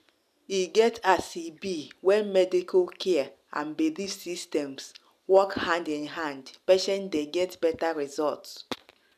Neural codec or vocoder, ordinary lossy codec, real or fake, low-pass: none; none; real; 14.4 kHz